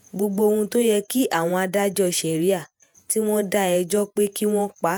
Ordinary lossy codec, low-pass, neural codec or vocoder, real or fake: none; none; vocoder, 48 kHz, 128 mel bands, Vocos; fake